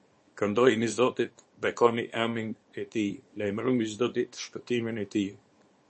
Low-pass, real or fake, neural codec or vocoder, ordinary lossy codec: 10.8 kHz; fake; codec, 24 kHz, 0.9 kbps, WavTokenizer, small release; MP3, 32 kbps